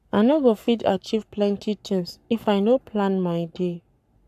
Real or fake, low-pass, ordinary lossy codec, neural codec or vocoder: fake; 14.4 kHz; none; codec, 44.1 kHz, 7.8 kbps, Pupu-Codec